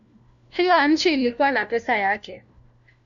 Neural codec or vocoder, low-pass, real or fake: codec, 16 kHz, 1 kbps, FunCodec, trained on LibriTTS, 50 frames a second; 7.2 kHz; fake